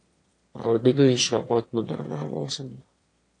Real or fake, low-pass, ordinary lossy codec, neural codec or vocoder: fake; 9.9 kHz; AAC, 48 kbps; autoencoder, 22.05 kHz, a latent of 192 numbers a frame, VITS, trained on one speaker